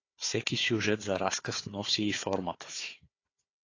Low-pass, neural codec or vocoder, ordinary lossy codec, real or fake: 7.2 kHz; codec, 16 kHz, 4 kbps, FunCodec, trained on Chinese and English, 50 frames a second; AAC, 32 kbps; fake